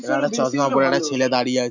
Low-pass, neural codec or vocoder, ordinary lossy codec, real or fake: 7.2 kHz; none; none; real